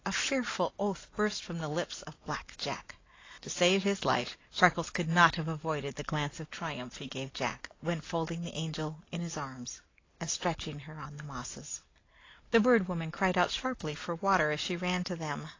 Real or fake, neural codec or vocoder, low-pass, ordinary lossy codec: real; none; 7.2 kHz; AAC, 32 kbps